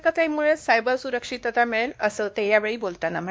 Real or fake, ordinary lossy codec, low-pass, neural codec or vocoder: fake; none; none; codec, 16 kHz, 2 kbps, X-Codec, WavLM features, trained on Multilingual LibriSpeech